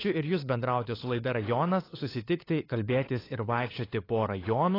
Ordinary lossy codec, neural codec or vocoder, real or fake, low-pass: AAC, 24 kbps; codec, 16 kHz, 8 kbps, FunCodec, trained on LibriTTS, 25 frames a second; fake; 5.4 kHz